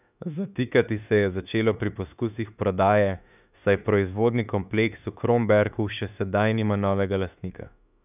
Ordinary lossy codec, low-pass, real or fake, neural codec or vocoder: none; 3.6 kHz; fake; autoencoder, 48 kHz, 32 numbers a frame, DAC-VAE, trained on Japanese speech